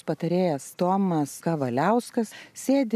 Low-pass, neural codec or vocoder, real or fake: 14.4 kHz; none; real